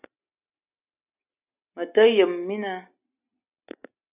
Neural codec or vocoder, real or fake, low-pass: none; real; 3.6 kHz